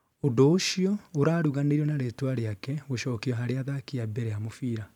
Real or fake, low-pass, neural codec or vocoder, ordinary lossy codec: fake; 19.8 kHz; vocoder, 44.1 kHz, 128 mel bands every 512 samples, BigVGAN v2; none